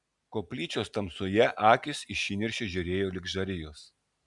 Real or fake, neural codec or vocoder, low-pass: real; none; 10.8 kHz